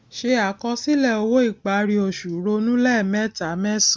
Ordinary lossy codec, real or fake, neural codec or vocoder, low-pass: none; real; none; none